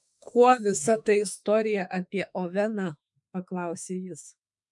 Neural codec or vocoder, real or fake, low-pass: autoencoder, 48 kHz, 32 numbers a frame, DAC-VAE, trained on Japanese speech; fake; 10.8 kHz